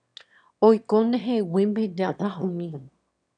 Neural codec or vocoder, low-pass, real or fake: autoencoder, 22.05 kHz, a latent of 192 numbers a frame, VITS, trained on one speaker; 9.9 kHz; fake